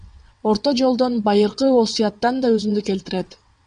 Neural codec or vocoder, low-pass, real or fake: vocoder, 22.05 kHz, 80 mel bands, WaveNeXt; 9.9 kHz; fake